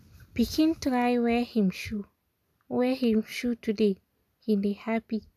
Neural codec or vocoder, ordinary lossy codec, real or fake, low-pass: none; none; real; 14.4 kHz